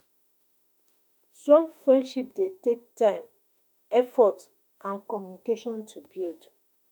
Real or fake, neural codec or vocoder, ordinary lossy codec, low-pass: fake; autoencoder, 48 kHz, 32 numbers a frame, DAC-VAE, trained on Japanese speech; none; none